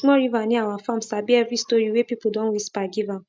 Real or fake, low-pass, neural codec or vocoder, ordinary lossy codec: real; none; none; none